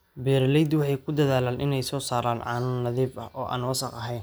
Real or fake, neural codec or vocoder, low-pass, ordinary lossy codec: real; none; none; none